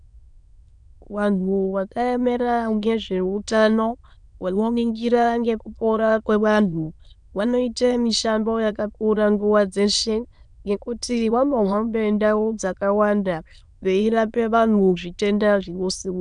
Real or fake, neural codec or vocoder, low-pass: fake; autoencoder, 22.05 kHz, a latent of 192 numbers a frame, VITS, trained on many speakers; 9.9 kHz